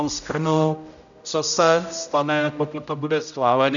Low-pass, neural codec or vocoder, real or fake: 7.2 kHz; codec, 16 kHz, 0.5 kbps, X-Codec, HuBERT features, trained on general audio; fake